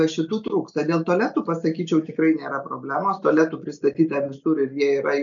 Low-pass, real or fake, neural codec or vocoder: 7.2 kHz; real; none